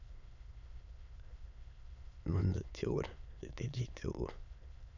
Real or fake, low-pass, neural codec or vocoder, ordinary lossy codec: fake; 7.2 kHz; autoencoder, 22.05 kHz, a latent of 192 numbers a frame, VITS, trained on many speakers; none